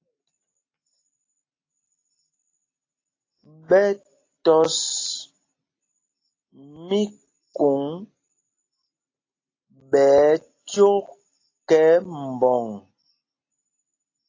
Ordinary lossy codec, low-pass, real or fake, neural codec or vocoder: AAC, 32 kbps; 7.2 kHz; real; none